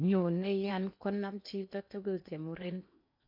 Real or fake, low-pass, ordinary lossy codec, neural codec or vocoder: fake; 5.4 kHz; MP3, 48 kbps; codec, 16 kHz in and 24 kHz out, 0.8 kbps, FocalCodec, streaming, 65536 codes